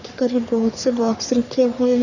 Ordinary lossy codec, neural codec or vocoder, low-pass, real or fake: none; codec, 44.1 kHz, 3.4 kbps, Pupu-Codec; 7.2 kHz; fake